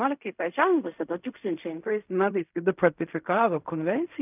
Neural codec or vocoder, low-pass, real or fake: codec, 16 kHz in and 24 kHz out, 0.4 kbps, LongCat-Audio-Codec, fine tuned four codebook decoder; 3.6 kHz; fake